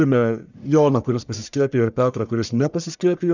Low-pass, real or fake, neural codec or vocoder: 7.2 kHz; fake; codec, 44.1 kHz, 1.7 kbps, Pupu-Codec